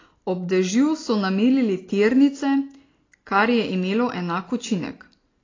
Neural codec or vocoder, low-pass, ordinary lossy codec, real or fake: none; 7.2 kHz; AAC, 32 kbps; real